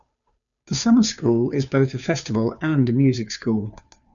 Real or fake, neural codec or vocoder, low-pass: fake; codec, 16 kHz, 2 kbps, FunCodec, trained on Chinese and English, 25 frames a second; 7.2 kHz